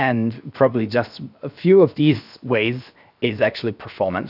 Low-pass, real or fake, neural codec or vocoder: 5.4 kHz; fake; codec, 16 kHz, 0.7 kbps, FocalCodec